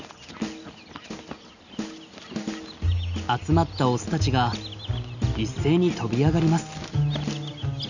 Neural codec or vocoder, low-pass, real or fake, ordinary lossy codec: none; 7.2 kHz; real; none